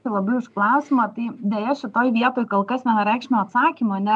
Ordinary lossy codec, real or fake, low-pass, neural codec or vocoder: MP3, 96 kbps; real; 10.8 kHz; none